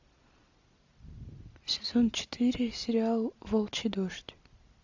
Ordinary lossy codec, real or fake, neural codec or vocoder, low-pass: AAC, 48 kbps; real; none; 7.2 kHz